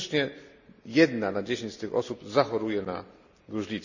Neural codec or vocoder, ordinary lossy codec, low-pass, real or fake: none; none; 7.2 kHz; real